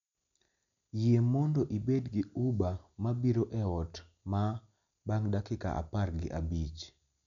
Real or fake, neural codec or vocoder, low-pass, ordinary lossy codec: real; none; 7.2 kHz; none